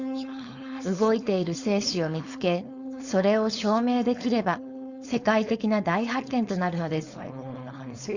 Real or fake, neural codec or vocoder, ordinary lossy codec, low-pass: fake; codec, 16 kHz, 4.8 kbps, FACodec; Opus, 64 kbps; 7.2 kHz